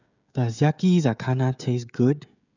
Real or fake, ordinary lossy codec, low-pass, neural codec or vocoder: fake; none; 7.2 kHz; codec, 16 kHz, 16 kbps, FreqCodec, smaller model